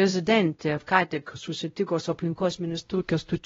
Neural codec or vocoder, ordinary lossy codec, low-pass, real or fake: codec, 16 kHz, 0.5 kbps, X-Codec, WavLM features, trained on Multilingual LibriSpeech; AAC, 24 kbps; 7.2 kHz; fake